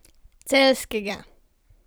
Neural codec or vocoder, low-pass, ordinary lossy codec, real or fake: vocoder, 44.1 kHz, 128 mel bands every 256 samples, BigVGAN v2; none; none; fake